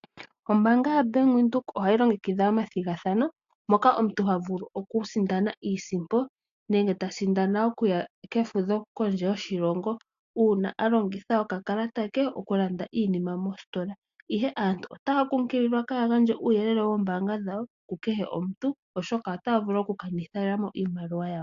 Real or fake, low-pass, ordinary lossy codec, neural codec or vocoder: real; 7.2 kHz; AAC, 64 kbps; none